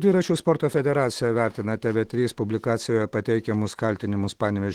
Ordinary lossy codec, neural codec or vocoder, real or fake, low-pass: Opus, 16 kbps; autoencoder, 48 kHz, 128 numbers a frame, DAC-VAE, trained on Japanese speech; fake; 19.8 kHz